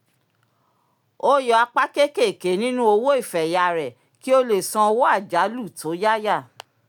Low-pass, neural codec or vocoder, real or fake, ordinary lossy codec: 19.8 kHz; none; real; none